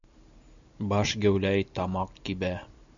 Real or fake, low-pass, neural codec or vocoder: real; 7.2 kHz; none